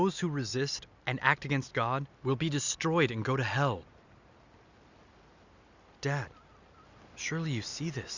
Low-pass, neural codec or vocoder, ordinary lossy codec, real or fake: 7.2 kHz; none; Opus, 64 kbps; real